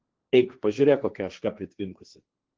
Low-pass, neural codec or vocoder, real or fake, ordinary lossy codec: 7.2 kHz; codec, 16 kHz, 1.1 kbps, Voila-Tokenizer; fake; Opus, 16 kbps